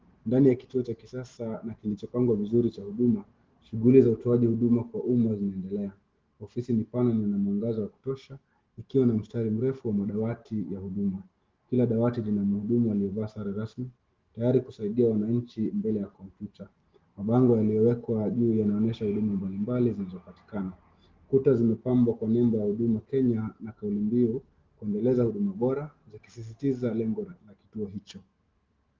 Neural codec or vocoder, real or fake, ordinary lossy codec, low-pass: none; real; Opus, 16 kbps; 7.2 kHz